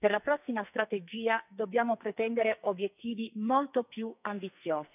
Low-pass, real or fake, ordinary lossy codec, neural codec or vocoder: 3.6 kHz; fake; none; codec, 44.1 kHz, 2.6 kbps, SNAC